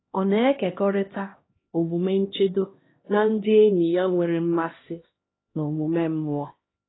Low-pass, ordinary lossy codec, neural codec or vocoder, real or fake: 7.2 kHz; AAC, 16 kbps; codec, 16 kHz, 1 kbps, X-Codec, HuBERT features, trained on LibriSpeech; fake